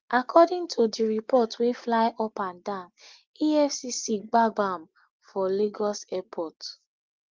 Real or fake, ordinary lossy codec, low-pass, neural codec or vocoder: real; Opus, 32 kbps; 7.2 kHz; none